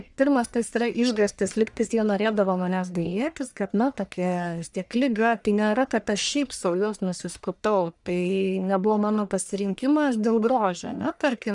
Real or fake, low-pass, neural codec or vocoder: fake; 10.8 kHz; codec, 44.1 kHz, 1.7 kbps, Pupu-Codec